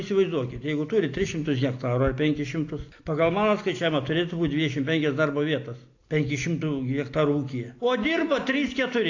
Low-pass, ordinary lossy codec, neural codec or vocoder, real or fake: 7.2 kHz; AAC, 48 kbps; none; real